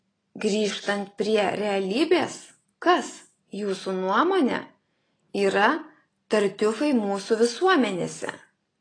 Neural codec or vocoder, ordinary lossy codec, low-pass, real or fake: none; AAC, 32 kbps; 9.9 kHz; real